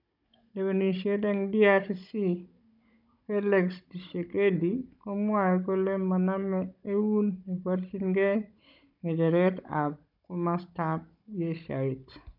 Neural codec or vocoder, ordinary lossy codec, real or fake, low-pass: codec, 16 kHz, 16 kbps, FunCodec, trained on Chinese and English, 50 frames a second; none; fake; 5.4 kHz